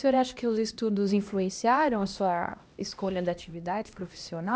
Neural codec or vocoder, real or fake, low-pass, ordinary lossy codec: codec, 16 kHz, 1 kbps, X-Codec, HuBERT features, trained on LibriSpeech; fake; none; none